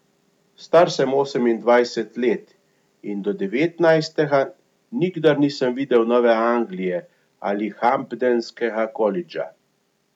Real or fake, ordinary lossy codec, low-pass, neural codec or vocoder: real; none; 19.8 kHz; none